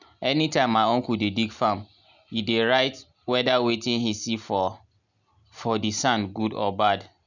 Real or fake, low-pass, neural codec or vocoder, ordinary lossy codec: real; 7.2 kHz; none; none